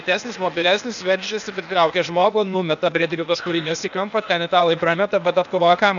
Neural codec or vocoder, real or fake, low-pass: codec, 16 kHz, 0.8 kbps, ZipCodec; fake; 7.2 kHz